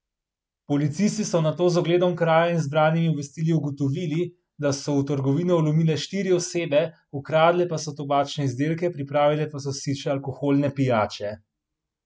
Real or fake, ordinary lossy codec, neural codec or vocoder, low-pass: real; none; none; none